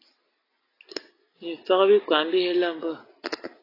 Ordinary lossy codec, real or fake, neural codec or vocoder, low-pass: AAC, 24 kbps; real; none; 5.4 kHz